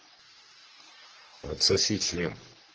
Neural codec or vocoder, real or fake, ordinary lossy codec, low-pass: codec, 44.1 kHz, 3.4 kbps, Pupu-Codec; fake; Opus, 16 kbps; 7.2 kHz